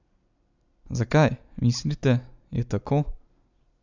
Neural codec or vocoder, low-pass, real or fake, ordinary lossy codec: none; 7.2 kHz; real; none